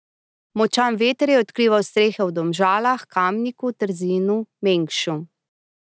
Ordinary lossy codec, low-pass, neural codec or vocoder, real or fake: none; none; none; real